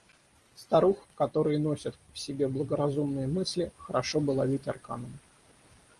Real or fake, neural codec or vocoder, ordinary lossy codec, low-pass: real; none; Opus, 24 kbps; 10.8 kHz